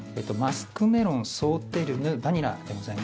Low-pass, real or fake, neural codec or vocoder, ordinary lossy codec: none; real; none; none